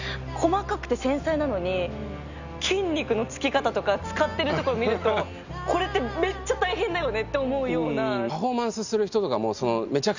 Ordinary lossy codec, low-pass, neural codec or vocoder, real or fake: Opus, 64 kbps; 7.2 kHz; none; real